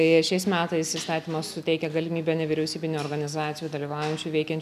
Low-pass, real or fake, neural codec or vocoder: 14.4 kHz; real; none